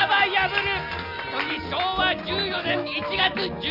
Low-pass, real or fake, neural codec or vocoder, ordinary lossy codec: 5.4 kHz; real; none; none